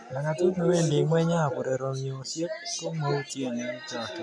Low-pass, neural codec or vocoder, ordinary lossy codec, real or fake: 9.9 kHz; none; none; real